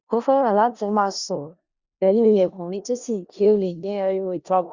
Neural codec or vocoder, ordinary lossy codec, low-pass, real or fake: codec, 16 kHz in and 24 kHz out, 0.4 kbps, LongCat-Audio-Codec, four codebook decoder; Opus, 64 kbps; 7.2 kHz; fake